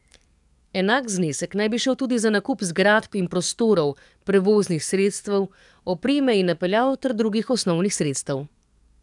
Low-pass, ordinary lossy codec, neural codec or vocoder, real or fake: 10.8 kHz; none; codec, 44.1 kHz, 7.8 kbps, DAC; fake